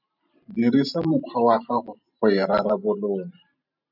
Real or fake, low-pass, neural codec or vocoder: fake; 5.4 kHz; vocoder, 44.1 kHz, 128 mel bands every 512 samples, BigVGAN v2